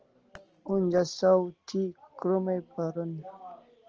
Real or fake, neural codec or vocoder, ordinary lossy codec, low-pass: real; none; Opus, 16 kbps; 7.2 kHz